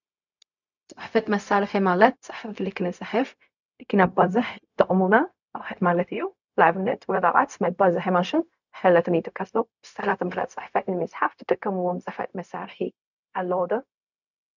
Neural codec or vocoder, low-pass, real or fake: codec, 16 kHz, 0.4 kbps, LongCat-Audio-Codec; 7.2 kHz; fake